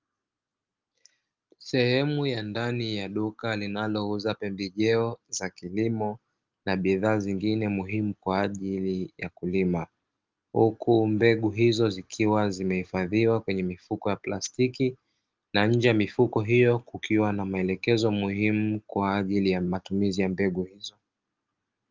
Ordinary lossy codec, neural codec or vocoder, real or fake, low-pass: Opus, 32 kbps; none; real; 7.2 kHz